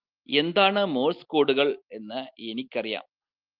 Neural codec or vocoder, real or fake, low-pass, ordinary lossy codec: none; real; 5.4 kHz; Opus, 32 kbps